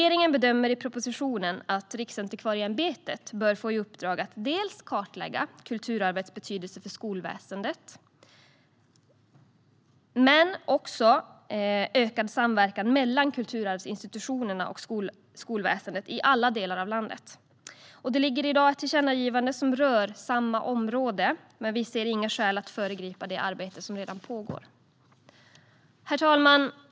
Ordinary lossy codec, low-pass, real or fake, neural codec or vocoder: none; none; real; none